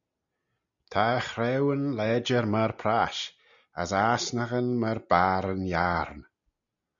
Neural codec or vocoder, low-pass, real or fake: none; 7.2 kHz; real